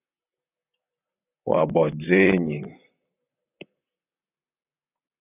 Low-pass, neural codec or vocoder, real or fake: 3.6 kHz; vocoder, 44.1 kHz, 128 mel bands, Pupu-Vocoder; fake